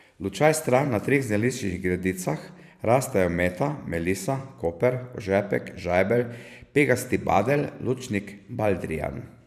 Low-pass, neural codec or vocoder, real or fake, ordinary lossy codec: 14.4 kHz; vocoder, 44.1 kHz, 128 mel bands every 512 samples, BigVGAN v2; fake; none